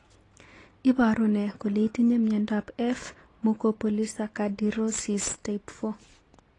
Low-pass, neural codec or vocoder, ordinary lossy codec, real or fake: 10.8 kHz; none; AAC, 32 kbps; real